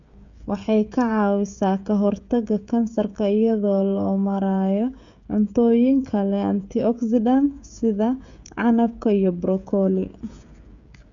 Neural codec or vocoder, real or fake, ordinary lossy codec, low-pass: codec, 16 kHz, 16 kbps, FreqCodec, smaller model; fake; Opus, 64 kbps; 7.2 kHz